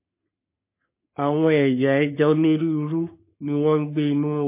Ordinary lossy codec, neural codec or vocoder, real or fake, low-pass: MP3, 24 kbps; codec, 44.1 kHz, 3.4 kbps, Pupu-Codec; fake; 3.6 kHz